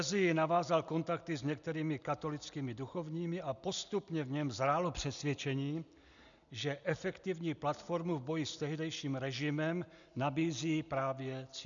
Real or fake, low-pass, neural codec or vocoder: real; 7.2 kHz; none